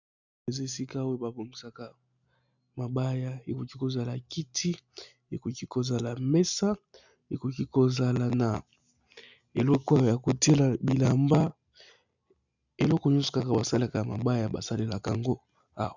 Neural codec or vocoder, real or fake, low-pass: none; real; 7.2 kHz